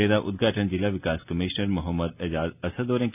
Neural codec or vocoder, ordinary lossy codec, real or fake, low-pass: none; none; real; 3.6 kHz